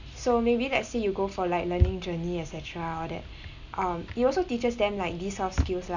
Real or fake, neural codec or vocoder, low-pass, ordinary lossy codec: real; none; 7.2 kHz; none